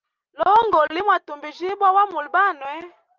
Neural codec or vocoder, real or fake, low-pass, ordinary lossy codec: none; real; 7.2 kHz; Opus, 32 kbps